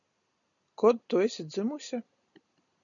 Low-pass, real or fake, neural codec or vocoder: 7.2 kHz; real; none